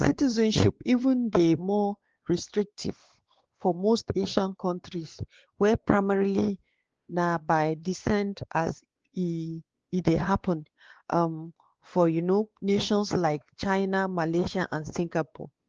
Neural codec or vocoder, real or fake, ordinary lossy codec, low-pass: codec, 16 kHz, 2 kbps, X-Codec, WavLM features, trained on Multilingual LibriSpeech; fake; Opus, 16 kbps; 7.2 kHz